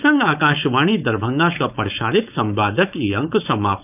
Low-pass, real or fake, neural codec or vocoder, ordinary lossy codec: 3.6 kHz; fake; codec, 16 kHz, 4.8 kbps, FACodec; none